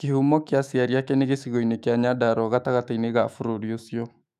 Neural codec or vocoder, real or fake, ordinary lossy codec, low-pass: autoencoder, 48 kHz, 128 numbers a frame, DAC-VAE, trained on Japanese speech; fake; none; 14.4 kHz